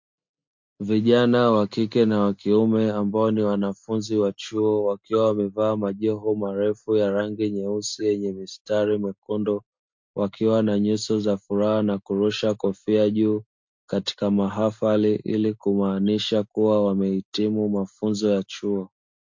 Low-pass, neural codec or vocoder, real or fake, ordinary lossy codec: 7.2 kHz; none; real; MP3, 48 kbps